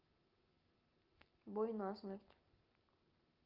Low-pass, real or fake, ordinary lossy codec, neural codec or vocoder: 5.4 kHz; real; Opus, 24 kbps; none